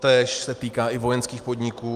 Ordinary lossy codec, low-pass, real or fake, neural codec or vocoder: Opus, 32 kbps; 14.4 kHz; real; none